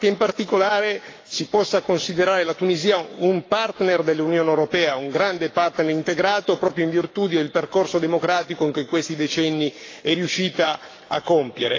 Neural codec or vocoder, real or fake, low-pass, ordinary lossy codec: codec, 44.1 kHz, 7.8 kbps, Pupu-Codec; fake; 7.2 kHz; AAC, 32 kbps